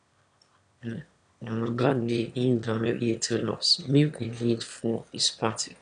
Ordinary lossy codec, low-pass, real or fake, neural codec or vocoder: none; 9.9 kHz; fake; autoencoder, 22.05 kHz, a latent of 192 numbers a frame, VITS, trained on one speaker